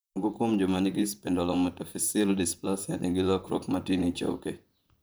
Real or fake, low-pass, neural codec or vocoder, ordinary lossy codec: fake; none; vocoder, 44.1 kHz, 128 mel bands, Pupu-Vocoder; none